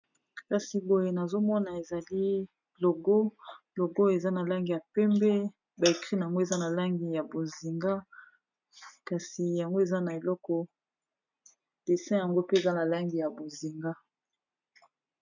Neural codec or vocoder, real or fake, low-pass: none; real; 7.2 kHz